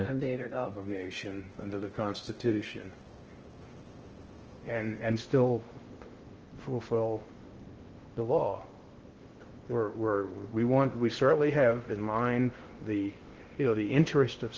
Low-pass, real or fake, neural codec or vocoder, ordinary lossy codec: 7.2 kHz; fake; codec, 16 kHz in and 24 kHz out, 0.6 kbps, FocalCodec, streaming, 2048 codes; Opus, 16 kbps